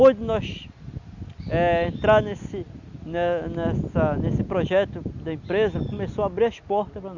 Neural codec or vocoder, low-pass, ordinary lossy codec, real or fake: none; 7.2 kHz; none; real